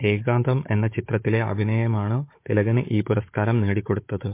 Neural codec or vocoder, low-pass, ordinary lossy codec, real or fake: codec, 16 kHz, 16 kbps, FunCodec, trained on Chinese and English, 50 frames a second; 3.6 kHz; MP3, 24 kbps; fake